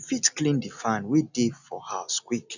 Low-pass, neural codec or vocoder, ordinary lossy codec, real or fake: 7.2 kHz; none; none; real